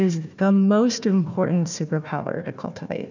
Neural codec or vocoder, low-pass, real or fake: codec, 16 kHz, 1 kbps, FunCodec, trained on Chinese and English, 50 frames a second; 7.2 kHz; fake